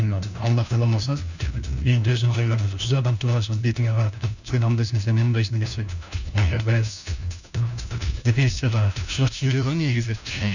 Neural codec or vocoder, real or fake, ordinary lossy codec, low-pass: codec, 16 kHz, 1 kbps, FunCodec, trained on LibriTTS, 50 frames a second; fake; none; 7.2 kHz